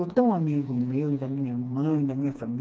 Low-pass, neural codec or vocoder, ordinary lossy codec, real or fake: none; codec, 16 kHz, 2 kbps, FreqCodec, smaller model; none; fake